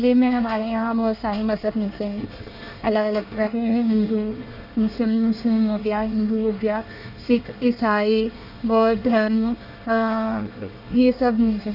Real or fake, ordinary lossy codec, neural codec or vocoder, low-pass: fake; none; codec, 24 kHz, 1 kbps, SNAC; 5.4 kHz